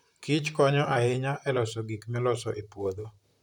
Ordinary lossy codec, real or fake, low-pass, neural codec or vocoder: none; fake; 19.8 kHz; vocoder, 44.1 kHz, 128 mel bands, Pupu-Vocoder